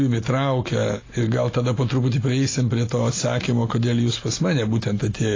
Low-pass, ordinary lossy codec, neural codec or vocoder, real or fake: 7.2 kHz; AAC, 32 kbps; none; real